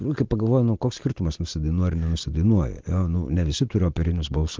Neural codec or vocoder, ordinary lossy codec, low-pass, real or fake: none; Opus, 16 kbps; 7.2 kHz; real